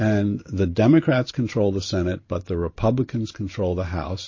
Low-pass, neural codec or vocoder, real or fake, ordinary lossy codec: 7.2 kHz; vocoder, 44.1 kHz, 128 mel bands every 512 samples, BigVGAN v2; fake; MP3, 32 kbps